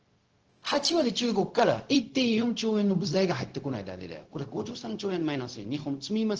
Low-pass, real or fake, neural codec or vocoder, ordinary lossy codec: 7.2 kHz; fake; codec, 16 kHz, 0.4 kbps, LongCat-Audio-Codec; Opus, 16 kbps